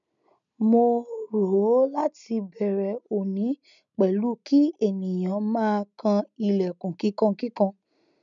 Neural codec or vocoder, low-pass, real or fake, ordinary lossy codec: none; 7.2 kHz; real; none